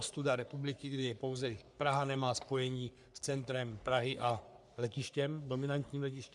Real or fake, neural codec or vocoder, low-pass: fake; codec, 44.1 kHz, 3.4 kbps, Pupu-Codec; 10.8 kHz